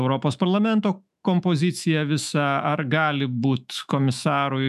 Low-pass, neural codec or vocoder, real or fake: 14.4 kHz; autoencoder, 48 kHz, 128 numbers a frame, DAC-VAE, trained on Japanese speech; fake